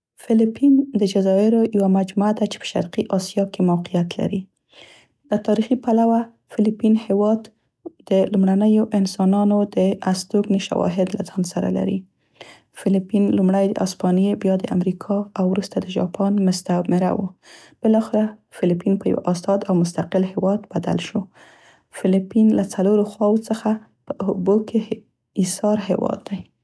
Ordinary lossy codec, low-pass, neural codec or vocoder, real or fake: none; none; none; real